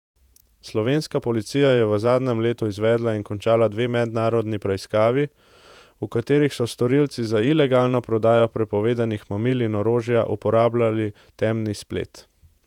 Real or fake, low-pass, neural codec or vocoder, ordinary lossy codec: fake; 19.8 kHz; vocoder, 48 kHz, 128 mel bands, Vocos; none